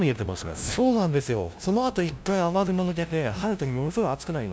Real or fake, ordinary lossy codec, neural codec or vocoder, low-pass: fake; none; codec, 16 kHz, 0.5 kbps, FunCodec, trained on LibriTTS, 25 frames a second; none